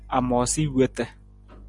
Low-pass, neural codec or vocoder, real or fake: 10.8 kHz; none; real